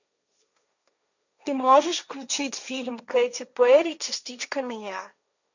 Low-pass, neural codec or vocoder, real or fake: 7.2 kHz; codec, 16 kHz, 1.1 kbps, Voila-Tokenizer; fake